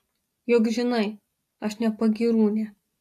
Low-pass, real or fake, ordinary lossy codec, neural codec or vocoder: 14.4 kHz; real; AAC, 64 kbps; none